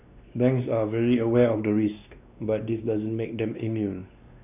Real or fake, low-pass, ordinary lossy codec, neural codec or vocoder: fake; 3.6 kHz; none; codec, 16 kHz, 2 kbps, X-Codec, WavLM features, trained on Multilingual LibriSpeech